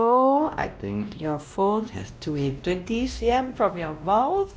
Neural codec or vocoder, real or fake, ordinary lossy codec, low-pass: codec, 16 kHz, 1 kbps, X-Codec, WavLM features, trained on Multilingual LibriSpeech; fake; none; none